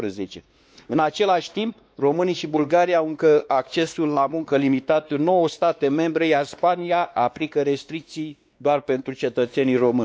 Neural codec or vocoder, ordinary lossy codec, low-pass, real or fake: codec, 16 kHz, 2 kbps, X-Codec, WavLM features, trained on Multilingual LibriSpeech; none; none; fake